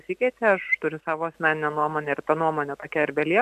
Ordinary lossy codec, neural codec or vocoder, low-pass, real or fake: AAC, 96 kbps; none; 14.4 kHz; real